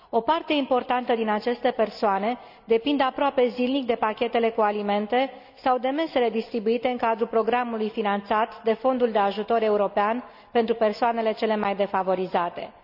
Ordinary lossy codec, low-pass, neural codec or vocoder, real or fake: none; 5.4 kHz; none; real